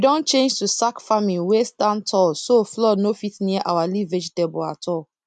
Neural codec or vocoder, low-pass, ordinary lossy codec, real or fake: none; 9.9 kHz; none; real